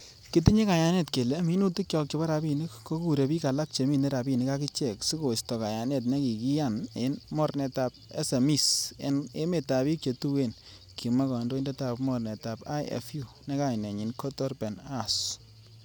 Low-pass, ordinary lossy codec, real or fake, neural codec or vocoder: none; none; real; none